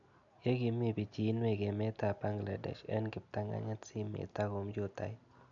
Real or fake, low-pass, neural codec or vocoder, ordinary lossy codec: real; 7.2 kHz; none; none